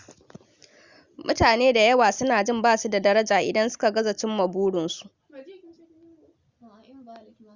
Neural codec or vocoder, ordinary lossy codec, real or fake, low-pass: none; Opus, 64 kbps; real; 7.2 kHz